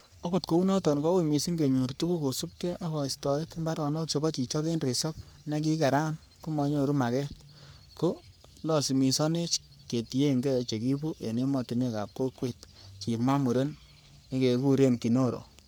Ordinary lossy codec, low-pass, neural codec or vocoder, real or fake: none; none; codec, 44.1 kHz, 3.4 kbps, Pupu-Codec; fake